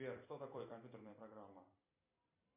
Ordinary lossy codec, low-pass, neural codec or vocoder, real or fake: MP3, 24 kbps; 3.6 kHz; vocoder, 44.1 kHz, 128 mel bands every 256 samples, BigVGAN v2; fake